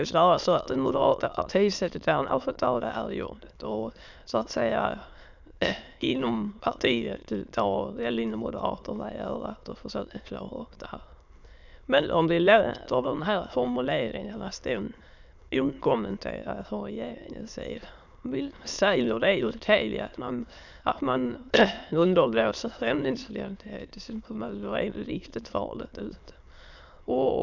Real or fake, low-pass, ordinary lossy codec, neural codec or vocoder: fake; 7.2 kHz; none; autoencoder, 22.05 kHz, a latent of 192 numbers a frame, VITS, trained on many speakers